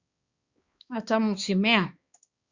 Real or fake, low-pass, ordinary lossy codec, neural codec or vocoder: fake; 7.2 kHz; Opus, 64 kbps; codec, 16 kHz, 2 kbps, X-Codec, HuBERT features, trained on balanced general audio